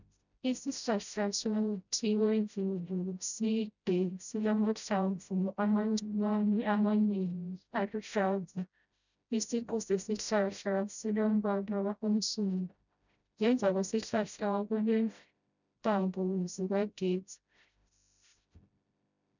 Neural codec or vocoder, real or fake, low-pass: codec, 16 kHz, 0.5 kbps, FreqCodec, smaller model; fake; 7.2 kHz